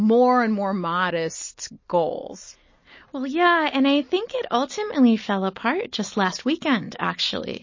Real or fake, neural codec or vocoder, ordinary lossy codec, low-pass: real; none; MP3, 32 kbps; 7.2 kHz